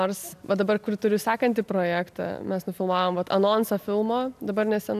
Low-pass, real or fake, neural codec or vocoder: 14.4 kHz; real; none